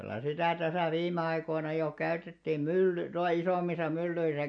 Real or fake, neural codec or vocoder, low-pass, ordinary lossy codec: real; none; 9.9 kHz; MP3, 48 kbps